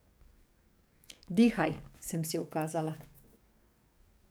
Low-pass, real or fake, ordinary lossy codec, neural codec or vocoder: none; fake; none; codec, 44.1 kHz, 7.8 kbps, DAC